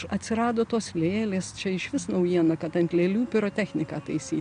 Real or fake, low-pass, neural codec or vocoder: real; 9.9 kHz; none